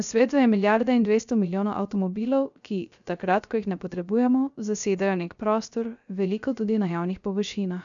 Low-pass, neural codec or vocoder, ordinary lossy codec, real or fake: 7.2 kHz; codec, 16 kHz, 0.3 kbps, FocalCodec; none; fake